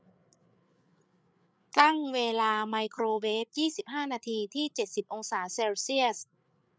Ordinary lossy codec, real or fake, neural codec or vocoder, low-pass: none; fake; codec, 16 kHz, 8 kbps, FreqCodec, larger model; none